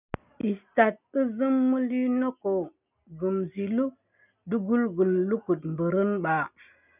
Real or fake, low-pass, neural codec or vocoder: real; 3.6 kHz; none